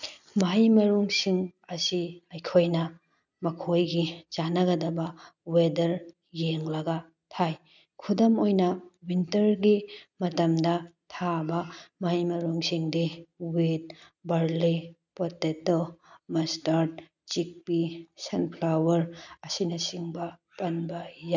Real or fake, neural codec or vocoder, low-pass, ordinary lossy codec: real; none; 7.2 kHz; none